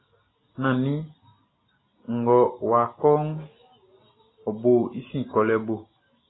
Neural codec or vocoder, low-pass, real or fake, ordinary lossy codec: none; 7.2 kHz; real; AAC, 16 kbps